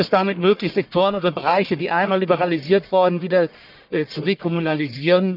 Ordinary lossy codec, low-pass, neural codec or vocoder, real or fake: none; 5.4 kHz; codec, 44.1 kHz, 1.7 kbps, Pupu-Codec; fake